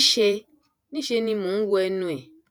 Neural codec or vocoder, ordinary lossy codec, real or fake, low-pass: vocoder, 48 kHz, 128 mel bands, Vocos; none; fake; none